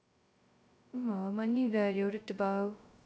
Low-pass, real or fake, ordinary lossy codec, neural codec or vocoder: none; fake; none; codec, 16 kHz, 0.2 kbps, FocalCodec